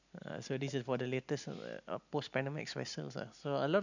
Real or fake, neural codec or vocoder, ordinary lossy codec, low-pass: real; none; none; 7.2 kHz